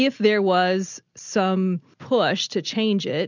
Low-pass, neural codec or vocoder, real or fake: 7.2 kHz; none; real